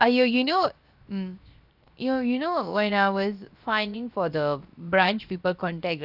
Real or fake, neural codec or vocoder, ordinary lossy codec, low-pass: fake; codec, 16 kHz, 0.7 kbps, FocalCodec; Opus, 64 kbps; 5.4 kHz